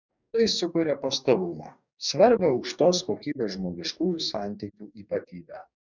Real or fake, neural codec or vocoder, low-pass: fake; codec, 44.1 kHz, 2.6 kbps, DAC; 7.2 kHz